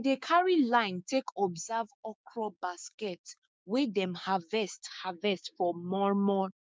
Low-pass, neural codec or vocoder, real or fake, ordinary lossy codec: none; codec, 16 kHz, 6 kbps, DAC; fake; none